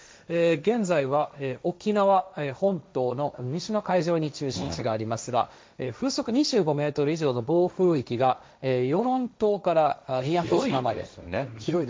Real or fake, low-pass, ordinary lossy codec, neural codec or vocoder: fake; none; none; codec, 16 kHz, 1.1 kbps, Voila-Tokenizer